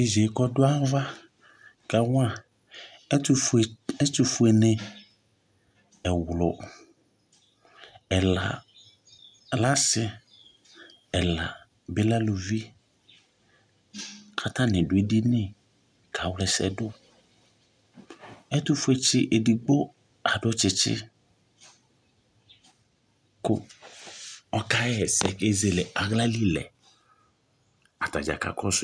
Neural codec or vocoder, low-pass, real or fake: none; 9.9 kHz; real